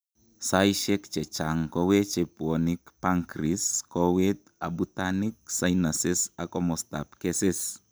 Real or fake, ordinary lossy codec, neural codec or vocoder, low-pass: real; none; none; none